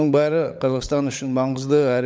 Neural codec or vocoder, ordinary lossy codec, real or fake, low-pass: codec, 16 kHz, 16 kbps, FunCodec, trained on LibriTTS, 50 frames a second; none; fake; none